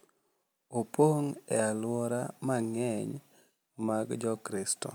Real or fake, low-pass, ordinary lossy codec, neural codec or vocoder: real; none; none; none